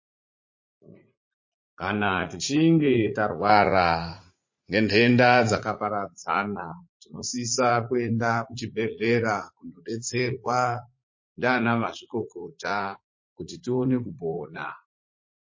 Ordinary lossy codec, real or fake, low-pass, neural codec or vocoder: MP3, 32 kbps; fake; 7.2 kHz; vocoder, 44.1 kHz, 80 mel bands, Vocos